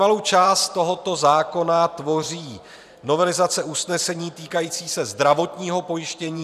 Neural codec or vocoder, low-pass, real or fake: vocoder, 44.1 kHz, 128 mel bands every 512 samples, BigVGAN v2; 14.4 kHz; fake